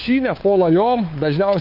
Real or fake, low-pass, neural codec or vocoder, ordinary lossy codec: fake; 5.4 kHz; codec, 16 kHz, 4 kbps, FunCodec, trained on LibriTTS, 50 frames a second; MP3, 32 kbps